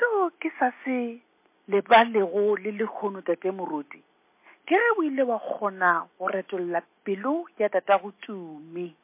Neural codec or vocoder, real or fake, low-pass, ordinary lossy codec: none; real; 3.6 kHz; MP3, 24 kbps